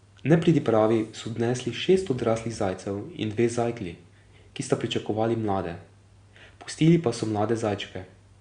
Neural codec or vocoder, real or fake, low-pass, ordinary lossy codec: none; real; 9.9 kHz; Opus, 64 kbps